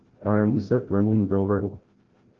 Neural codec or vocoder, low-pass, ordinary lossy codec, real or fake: codec, 16 kHz, 0.5 kbps, FreqCodec, larger model; 7.2 kHz; Opus, 16 kbps; fake